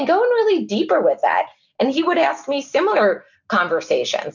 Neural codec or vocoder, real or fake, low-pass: none; real; 7.2 kHz